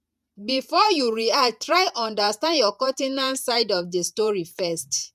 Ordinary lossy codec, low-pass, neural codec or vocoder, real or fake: none; 14.4 kHz; vocoder, 48 kHz, 128 mel bands, Vocos; fake